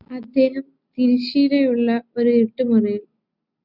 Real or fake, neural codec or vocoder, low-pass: real; none; 5.4 kHz